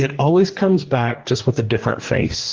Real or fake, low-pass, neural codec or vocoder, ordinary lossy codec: fake; 7.2 kHz; codec, 44.1 kHz, 2.6 kbps, DAC; Opus, 16 kbps